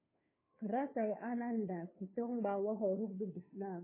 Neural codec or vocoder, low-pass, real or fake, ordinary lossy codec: codec, 16 kHz, 2 kbps, FunCodec, trained on Chinese and English, 25 frames a second; 3.6 kHz; fake; MP3, 16 kbps